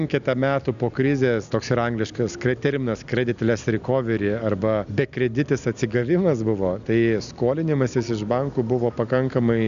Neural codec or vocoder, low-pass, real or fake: none; 7.2 kHz; real